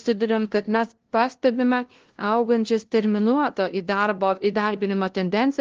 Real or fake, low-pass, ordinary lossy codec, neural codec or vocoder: fake; 7.2 kHz; Opus, 16 kbps; codec, 16 kHz, 0.5 kbps, FunCodec, trained on LibriTTS, 25 frames a second